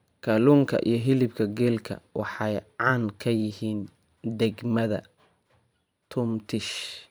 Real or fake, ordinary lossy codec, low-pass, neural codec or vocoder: fake; none; none; vocoder, 44.1 kHz, 128 mel bands every 512 samples, BigVGAN v2